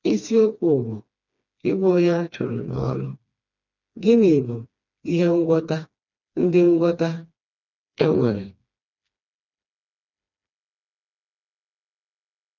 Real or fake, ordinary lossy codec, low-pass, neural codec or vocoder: fake; none; 7.2 kHz; codec, 16 kHz, 2 kbps, FreqCodec, smaller model